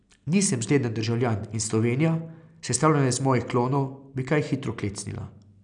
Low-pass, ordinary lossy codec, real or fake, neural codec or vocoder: 9.9 kHz; none; real; none